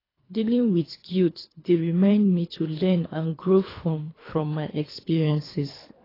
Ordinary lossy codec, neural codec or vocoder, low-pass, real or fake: AAC, 24 kbps; codec, 24 kHz, 3 kbps, HILCodec; 5.4 kHz; fake